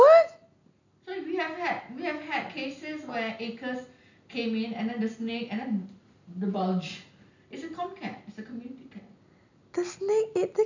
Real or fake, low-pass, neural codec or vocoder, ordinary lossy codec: real; 7.2 kHz; none; none